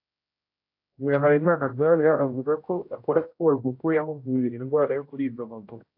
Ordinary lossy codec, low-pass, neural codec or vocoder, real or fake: AAC, 32 kbps; 5.4 kHz; codec, 16 kHz, 0.5 kbps, X-Codec, HuBERT features, trained on general audio; fake